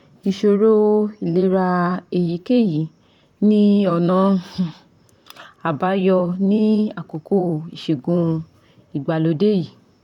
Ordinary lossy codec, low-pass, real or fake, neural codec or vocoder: none; 19.8 kHz; fake; vocoder, 44.1 kHz, 128 mel bands, Pupu-Vocoder